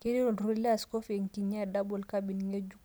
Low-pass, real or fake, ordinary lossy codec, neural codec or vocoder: none; real; none; none